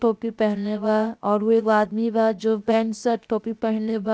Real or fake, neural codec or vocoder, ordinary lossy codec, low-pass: fake; codec, 16 kHz, 0.7 kbps, FocalCodec; none; none